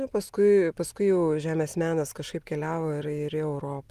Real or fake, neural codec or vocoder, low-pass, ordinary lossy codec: real; none; 14.4 kHz; Opus, 24 kbps